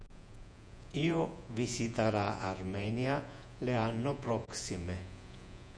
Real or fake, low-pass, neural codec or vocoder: fake; 9.9 kHz; vocoder, 48 kHz, 128 mel bands, Vocos